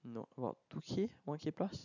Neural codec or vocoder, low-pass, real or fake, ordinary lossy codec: none; 7.2 kHz; real; none